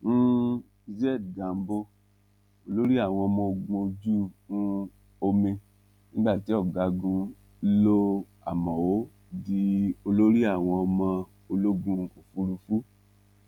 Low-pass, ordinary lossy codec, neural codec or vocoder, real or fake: 19.8 kHz; none; none; real